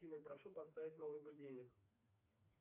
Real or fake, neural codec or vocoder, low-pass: fake; codec, 16 kHz, 2 kbps, FreqCodec, smaller model; 3.6 kHz